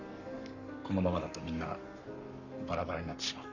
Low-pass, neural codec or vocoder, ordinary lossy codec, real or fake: 7.2 kHz; codec, 44.1 kHz, 7.8 kbps, Pupu-Codec; none; fake